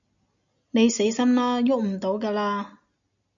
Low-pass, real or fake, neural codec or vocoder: 7.2 kHz; real; none